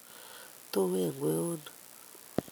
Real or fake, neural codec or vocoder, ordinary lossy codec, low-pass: real; none; none; none